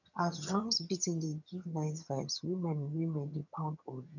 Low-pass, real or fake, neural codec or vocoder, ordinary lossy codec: 7.2 kHz; fake; vocoder, 22.05 kHz, 80 mel bands, HiFi-GAN; none